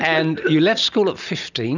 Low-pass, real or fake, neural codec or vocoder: 7.2 kHz; real; none